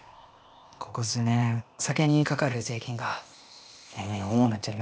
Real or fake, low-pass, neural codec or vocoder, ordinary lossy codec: fake; none; codec, 16 kHz, 0.8 kbps, ZipCodec; none